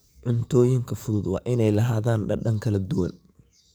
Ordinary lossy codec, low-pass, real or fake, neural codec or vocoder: none; none; fake; codec, 44.1 kHz, 7.8 kbps, DAC